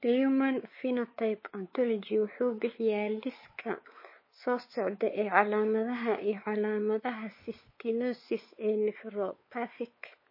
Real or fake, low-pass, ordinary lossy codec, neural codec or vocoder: fake; 5.4 kHz; MP3, 24 kbps; codec, 16 kHz, 4 kbps, X-Codec, WavLM features, trained on Multilingual LibriSpeech